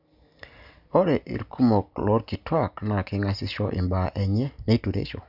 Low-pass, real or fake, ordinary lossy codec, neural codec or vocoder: 5.4 kHz; real; none; none